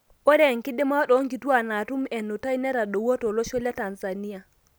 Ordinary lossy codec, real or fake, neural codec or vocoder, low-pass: none; real; none; none